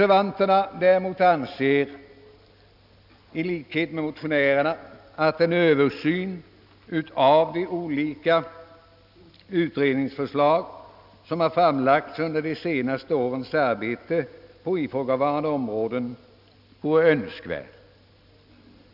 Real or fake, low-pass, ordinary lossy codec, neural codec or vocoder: real; 5.4 kHz; none; none